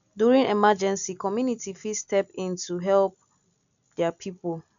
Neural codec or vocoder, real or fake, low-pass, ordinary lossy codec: none; real; 7.2 kHz; none